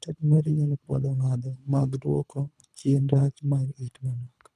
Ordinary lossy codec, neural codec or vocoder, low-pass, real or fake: none; codec, 24 kHz, 3 kbps, HILCodec; none; fake